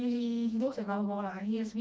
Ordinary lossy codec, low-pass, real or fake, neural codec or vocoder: none; none; fake; codec, 16 kHz, 1 kbps, FreqCodec, smaller model